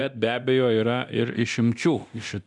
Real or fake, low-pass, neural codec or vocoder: fake; 10.8 kHz; codec, 24 kHz, 0.9 kbps, DualCodec